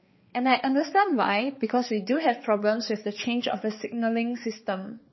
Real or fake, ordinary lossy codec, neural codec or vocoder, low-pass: fake; MP3, 24 kbps; codec, 16 kHz, 4 kbps, X-Codec, HuBERT features, trained on balanced general audio; 7.2 kHz